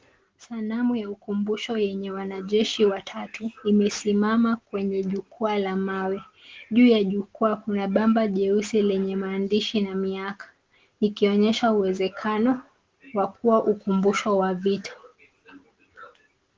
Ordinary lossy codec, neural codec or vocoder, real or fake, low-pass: Opus, 16 kbps; none; real; 7.2 kHz